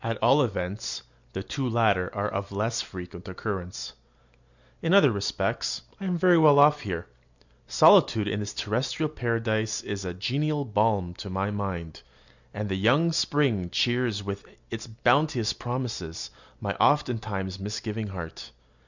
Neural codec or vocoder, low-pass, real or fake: none; 7.2 kHz; real